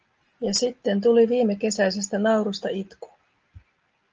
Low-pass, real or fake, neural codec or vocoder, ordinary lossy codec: 7.2 kHz; real; none; Opus, 24 kbps